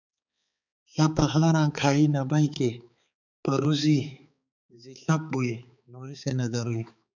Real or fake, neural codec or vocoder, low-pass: fake; codec, 16 kHz, 4 kbps, X-Codec, HuBERT features, trained on balanced general audio; 7.2 kHz